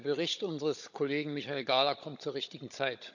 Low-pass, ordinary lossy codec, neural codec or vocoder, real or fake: 7.2 kHz; none; codec, 16 kHz, 16 kbps, FunCodec, trained on Chinese and English, 50 frames a second; fake